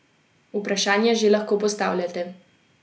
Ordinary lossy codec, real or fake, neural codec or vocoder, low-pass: none; real; none; none